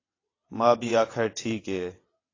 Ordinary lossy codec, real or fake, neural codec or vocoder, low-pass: AAC, 32 kbps; fake; vocoder, 22.05 kHz, 80 mel bands, WaveNeXt; 7.2 kHz